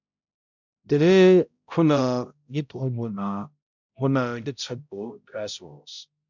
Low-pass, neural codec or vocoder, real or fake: 7.2 kHz; codec, 16 kHz, 0.5 kbps, X-Codec, HuBERT features, trained on balanced general audio; fake